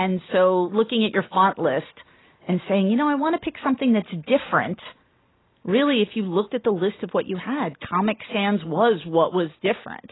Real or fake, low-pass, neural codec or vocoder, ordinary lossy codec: real; 7.2 kHz; none; AAC, 16 kbps